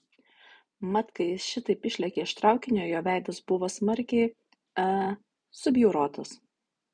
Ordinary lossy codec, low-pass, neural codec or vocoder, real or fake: MP3, 96 kbps; 9.9 kHz; none; real